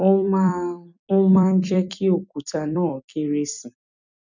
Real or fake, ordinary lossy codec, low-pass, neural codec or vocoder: fake; none; 7.2 kHz; vocoder, 44.1 kHz, 128 mel bands every 256 samples, BigVGAN v2